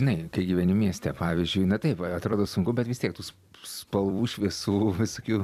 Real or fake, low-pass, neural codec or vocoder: fake; 14.4 kHz; vocoder, 44.1 kHz, 128 mel bands every 256 samples, BigVGAN v2